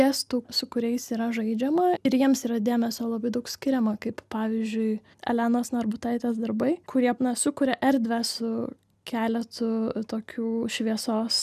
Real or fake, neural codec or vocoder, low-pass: real; none; 14.4 kHz